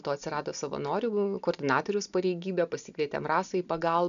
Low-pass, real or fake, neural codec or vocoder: 7.2 kHz; real; none